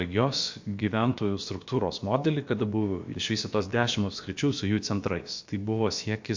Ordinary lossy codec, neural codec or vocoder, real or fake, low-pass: MP3, 48 kbps; codec, 16 kHz, about 1 kbps, DyCAST, with the encoder's durations; fake; 7.2 kHz